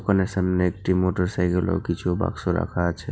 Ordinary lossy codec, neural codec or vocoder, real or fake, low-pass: none; none; real; none